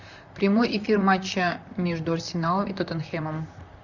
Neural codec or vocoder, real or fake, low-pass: vocoder, 44.1 kHz, 128 mel bands, Pupu-Vocoder; fake; 7.2 kHz